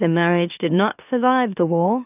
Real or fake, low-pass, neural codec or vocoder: fake; 3.6 kHz; autoencoder, 44.1 kHz, a latent of 192 numbers a frame, MeloTTS